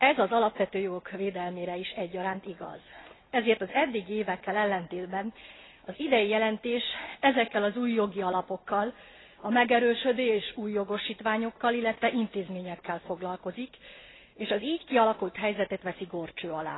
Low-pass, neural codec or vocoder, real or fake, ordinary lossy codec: 7.2 kHz; none; real; AAC, 16 kbps